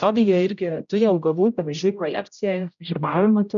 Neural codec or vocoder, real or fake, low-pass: codec, 16 kHz, 0.5 kbps, X-Codec, HuBERT features, trained on general audio; fake; 7.2 kHz